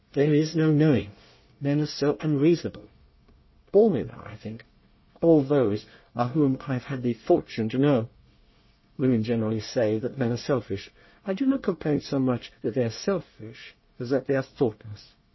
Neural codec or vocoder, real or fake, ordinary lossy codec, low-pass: codec, 24 kHz, 1 kbps, SNAC; fake; MP3, 24 kbps; 7.2 kHz